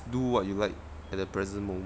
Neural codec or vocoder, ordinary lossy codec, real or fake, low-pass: none; none; real; none